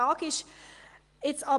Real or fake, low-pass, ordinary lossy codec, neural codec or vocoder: real; 10.8 kHz; Opus, 24 kbps; none